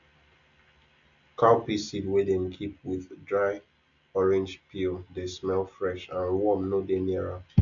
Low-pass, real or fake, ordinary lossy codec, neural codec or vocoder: 7.2 kHz; real; none; none